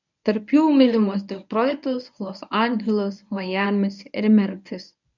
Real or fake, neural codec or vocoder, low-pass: fake; codec, 24 kHz, 0.9 kbps, WavTokenizer, medium speech release version 1; 7.2 kHz